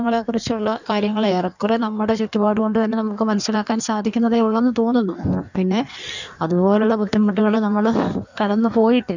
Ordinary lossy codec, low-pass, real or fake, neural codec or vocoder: none; 7.2 kHz; fake; codec, 16 kHz in and 24 kHz out, 1.1 kbps, FireRedTTS-2 codec